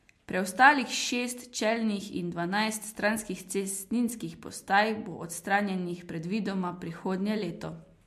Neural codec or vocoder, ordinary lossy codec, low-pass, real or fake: none; MP3, 64 kbps; 14.4 kHz; real